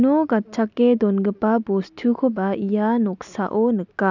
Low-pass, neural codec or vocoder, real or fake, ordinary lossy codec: 7.2 kHz; none; real; none